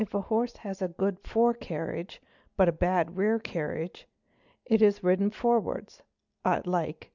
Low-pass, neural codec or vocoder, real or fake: 7.2 kHz; none; real